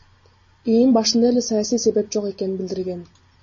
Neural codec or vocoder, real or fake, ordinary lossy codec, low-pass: none; real; MP3, 32 kbps; 7.2 kHz